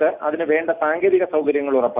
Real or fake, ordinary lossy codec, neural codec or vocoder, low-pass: real; none; none; 3.6 kHz